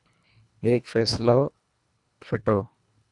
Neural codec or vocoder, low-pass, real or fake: codec, 24 kHz, 1.5 kbps, HILCodec; 10.8 kHz; fake